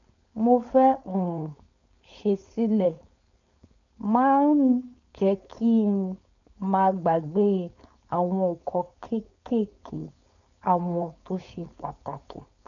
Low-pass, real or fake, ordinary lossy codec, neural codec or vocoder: 7.2 kHz; fake; none; codec, 16 kHz, 4.8 kbps, FACodec